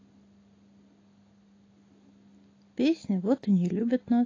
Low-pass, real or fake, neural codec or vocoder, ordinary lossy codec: 7.2 kHz; real; none; AAC, 32 kbps